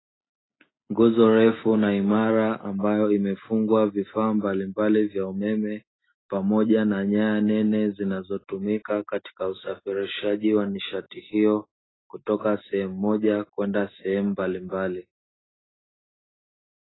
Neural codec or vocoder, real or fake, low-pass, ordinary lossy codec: none; real; 7.2 kHz; AAC, 16 kbps